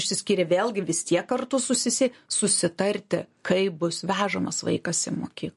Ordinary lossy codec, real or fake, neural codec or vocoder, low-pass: MP3, 48 kbps; real; none; 14.4 kHz